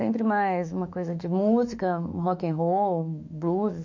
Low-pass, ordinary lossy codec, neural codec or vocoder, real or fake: 7.2 kHz; MP3, 64 kbps; autoencoder, 48 kHz, 32 numbers a frame, DAC-VAE, trained on Japanese speech; fake